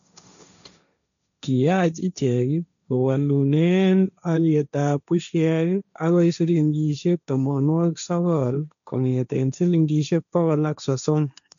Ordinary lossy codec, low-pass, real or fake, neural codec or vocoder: MP3, 96 kbps; 7.2 kHz; fake; codec, 16 kHz, 1.1 kbps, Voila-Tokenizer